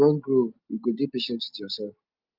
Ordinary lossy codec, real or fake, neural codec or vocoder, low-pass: Opus, 24 kbps; real; none; 5.4 kHz